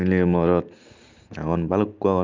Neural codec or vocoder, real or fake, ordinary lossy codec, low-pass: none; real; Opus, 24 kbps; 7.2 kHz